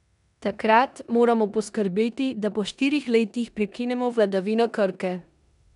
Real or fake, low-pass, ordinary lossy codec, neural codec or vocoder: fake; 10.8 kHz; none; codec, 16 kHz in and 24 kHz out, 0.9 kbps, LongCat-Audio-Codec, four codebook decoder